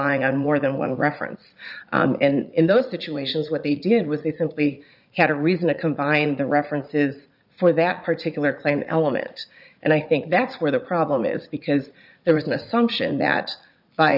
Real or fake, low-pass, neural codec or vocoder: fake; 5.4 kHz; vocoder, 44.1 kHz, 80 mel bands, Vocos